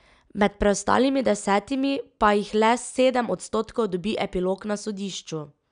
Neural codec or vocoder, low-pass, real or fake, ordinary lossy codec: none; 9.9 kHz; real; none